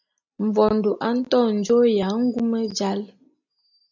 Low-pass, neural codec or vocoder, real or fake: 7.2 kHz; none; real